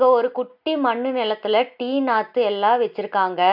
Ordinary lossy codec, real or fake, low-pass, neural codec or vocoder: none; real; 5.4 kHz; none